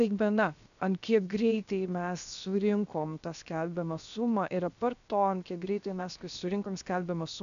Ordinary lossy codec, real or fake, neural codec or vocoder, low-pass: MP3, 96 kbps; fake; codec, 16 kHz, 0.7 kbps, FocalCodec; 7.2 kHz